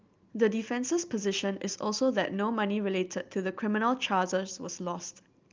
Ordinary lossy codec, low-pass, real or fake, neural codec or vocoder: Opus, 24 kbps; 7.2 kHz; real; none